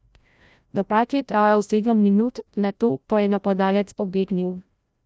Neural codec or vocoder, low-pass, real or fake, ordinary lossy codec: codec, 16 kHz, 0.5 kbps, FreqCodec, larger model; none; fake; none